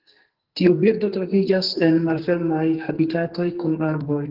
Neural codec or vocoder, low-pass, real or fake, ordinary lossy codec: codec, 44.1 kHz, 2.6 kbps, SNAC; 5.4 kHz; fake; Opus, 16 kbps